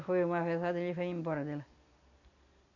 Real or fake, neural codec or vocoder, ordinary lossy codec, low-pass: real; none; none; 7.2 kHz